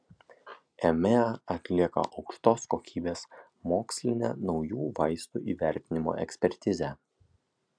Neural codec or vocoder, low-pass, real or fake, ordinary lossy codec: none; 9.9 kHz; real; MP3, 96 kbps